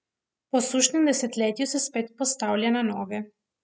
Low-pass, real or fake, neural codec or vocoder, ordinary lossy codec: none; real; none; none